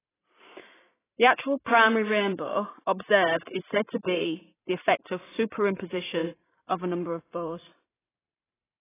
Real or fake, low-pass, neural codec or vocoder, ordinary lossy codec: fake; 3.6 kHz; vocoder, 44.1 kHz, 128 mel bands every 512 samples, BigVGAN v2; AAC, 16 kbps